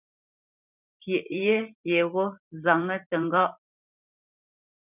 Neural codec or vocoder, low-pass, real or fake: vocoder, 44.1 kHz, 128 mel bands every 512 samples, BigVGAN v2; 3.6 kHz; fake